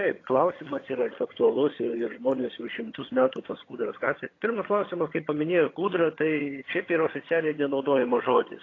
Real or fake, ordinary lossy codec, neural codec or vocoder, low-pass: fake; AAC, 32 kbps; vocoder, 22.05 kHz, 80 mel bands, HiFi-GAN; 7.2 kHz